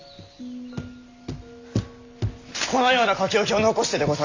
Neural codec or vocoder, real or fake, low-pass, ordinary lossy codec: none; real; 7.2 kHz; AAC, 48 kbps